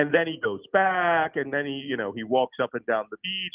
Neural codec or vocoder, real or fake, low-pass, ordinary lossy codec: none; real; 3.6 kHz; Opus, 24 kbps